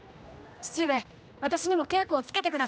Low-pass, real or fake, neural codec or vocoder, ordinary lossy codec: none; fake; codec, 16 kHz, 1 kbps, X-Codec, HuBERT features, trained on general audio; none